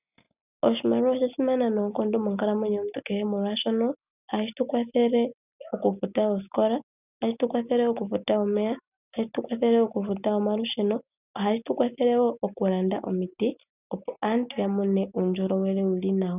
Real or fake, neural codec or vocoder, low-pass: real; none; 3.6 kHz